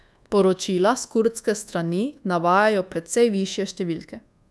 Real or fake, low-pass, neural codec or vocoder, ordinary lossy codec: fake; none; codec, 24 kHz, 1.2 kbps, DualCodec; none